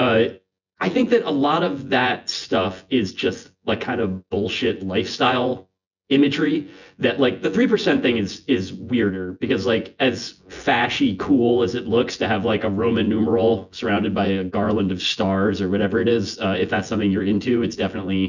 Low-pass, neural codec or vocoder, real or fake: 7.2 kHz; vocoder, 24 kHz, 100 mel bands, Vocos; fake